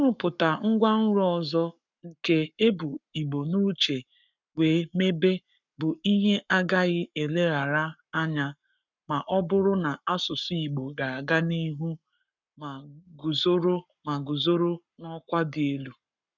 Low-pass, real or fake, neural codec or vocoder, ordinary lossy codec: 7.2 kHz; fake; codec, 44.1 kHz, 7.8 kbps, Pupu-Codec; none